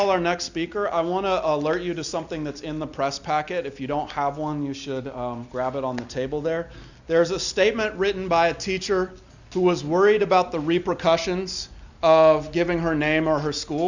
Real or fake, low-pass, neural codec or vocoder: real; 7.2 kHz; none